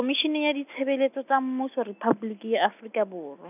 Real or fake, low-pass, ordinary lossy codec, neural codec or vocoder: real; 3.6 kHz; none; none